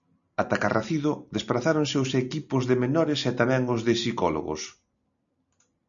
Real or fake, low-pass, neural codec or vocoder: real; 7.2 kHz; none